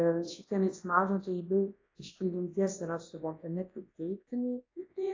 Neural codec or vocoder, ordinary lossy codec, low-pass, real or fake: codec, 24 kHz, 0.9 kbps, WavTokenizer, large speech release; AAC, 32 kbps; 7.2 kHz; fake